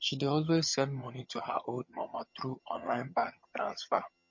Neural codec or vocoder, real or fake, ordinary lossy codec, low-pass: vocoder, 22.05 kHz, 80 mel bands, HiFi-GAN; fake; MP3, 32 kbps; 7.2 kHz